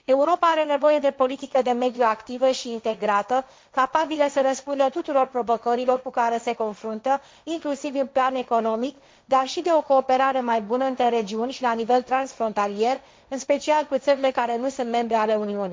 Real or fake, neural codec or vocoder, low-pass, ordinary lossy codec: fake; codec, 16 kHz, 1.1 kbps, Voila-Tokenizer; none; none